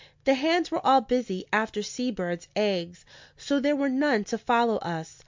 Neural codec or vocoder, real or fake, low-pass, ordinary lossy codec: none; real; 7.2 kHz; MP3, 64 kbps